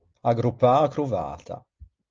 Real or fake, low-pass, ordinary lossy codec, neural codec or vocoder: real; 7.2 kHz; Opus, 24 kbps; none